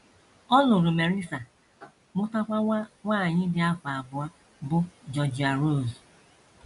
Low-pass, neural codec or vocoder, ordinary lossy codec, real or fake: 10.8 kHz; none; none; real